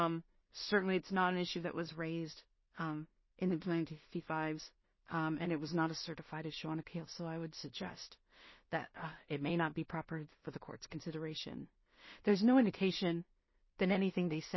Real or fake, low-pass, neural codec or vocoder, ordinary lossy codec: fake; 7.2 kHz; codec, 16 kHz in and 24 kHz out, 0.4 kbps, LongCat-Audio-Codec, two codebook decoder; MP3, 24 kbps